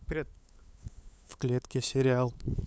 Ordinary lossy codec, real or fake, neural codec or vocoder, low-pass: none; fake; codec, 16 kHz, 8 kbps, FunCodec, trained on LibriTTS, 25 frames a second; none